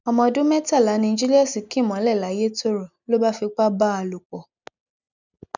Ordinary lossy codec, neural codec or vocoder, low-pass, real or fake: none; none; 7.2 kHz; real